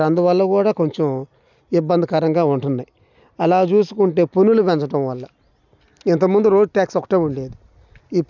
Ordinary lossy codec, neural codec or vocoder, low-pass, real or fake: none; none; 7.2 kHz; real